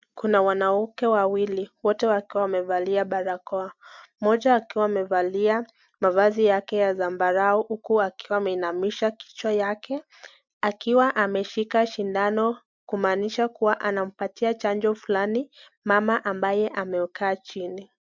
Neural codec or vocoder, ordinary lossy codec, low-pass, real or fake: none; MP3, 64 kbps; 7.2 kHz; real